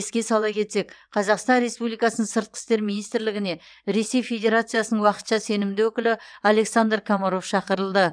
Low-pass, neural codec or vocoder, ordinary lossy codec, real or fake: 9.9 kHz; vocoder, 22.05 kHz, 80 mel bands, WaveNeXt; none; fake